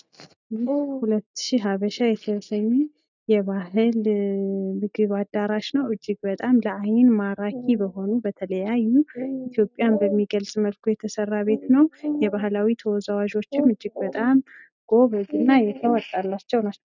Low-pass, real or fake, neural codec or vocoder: 7.2 kHz; real; none